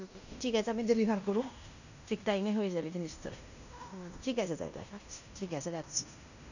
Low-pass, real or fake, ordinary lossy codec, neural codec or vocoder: 7.2 kHz; fake; none; codec, 16 kHz in and 24 kHz out, 0.9 kbps, LongCat-Audio-Codec, fine tuned four codebook decoder